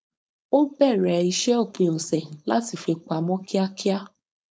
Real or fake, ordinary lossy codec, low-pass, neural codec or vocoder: fake; none; none; codec, 16 kHz, 4.8 kbps, FACodec